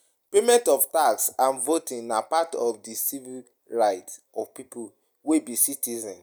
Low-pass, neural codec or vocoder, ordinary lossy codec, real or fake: none; none; none; real